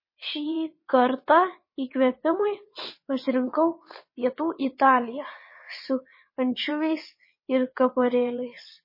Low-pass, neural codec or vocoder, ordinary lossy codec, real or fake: 5.4 kHz; vocoder, 22.05 kHz, 80 mel bands, WaveNeXt; MP3, 24 kbps; fake